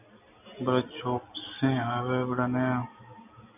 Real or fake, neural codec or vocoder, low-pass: real; none; 3.6 kHz